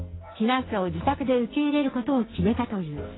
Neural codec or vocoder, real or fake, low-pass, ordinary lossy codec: codec, 44.1 kHz, 2.6 kbps, SNAC; fake; 7.2 kHz; AAC, 16 kbps